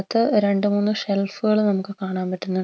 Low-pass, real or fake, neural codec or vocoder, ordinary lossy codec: none; real; none; none